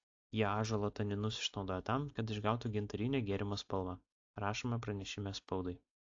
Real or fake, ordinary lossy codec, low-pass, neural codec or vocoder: real; AAC, 48 kbps; 7.2 kHz; none